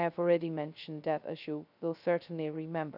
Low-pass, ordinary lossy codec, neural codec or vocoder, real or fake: 5.4 kHz; none; codec, 16 kHz, 0.2 kbps, FocalCodec; fake